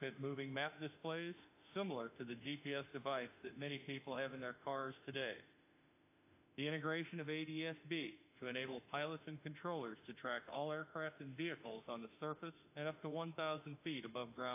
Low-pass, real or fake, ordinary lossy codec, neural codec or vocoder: 3.6 kHz; fake; AAC, 24 kbps; autoencoder, 48 kHz, 32 numbers a frame, DAC-VAE, trained on Japanese speech